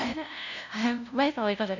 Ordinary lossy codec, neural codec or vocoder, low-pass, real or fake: none; codec, 16 kHz, 0.5 kbps, FunCodec, trained on LibriTTS, 25 frames a second; 7.2 kHz; fake